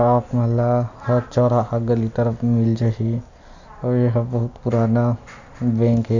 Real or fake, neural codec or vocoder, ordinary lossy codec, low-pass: real; none; none; 7.2 kHz